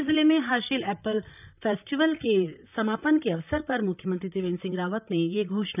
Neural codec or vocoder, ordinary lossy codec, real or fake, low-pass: vocoder, 44.1 kHz, 128 mel bands, Pupu-Vocoder; none; fake; 3.6 kHz